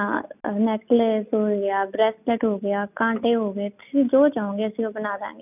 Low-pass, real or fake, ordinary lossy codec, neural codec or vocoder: 3.6 kHz; real; none; none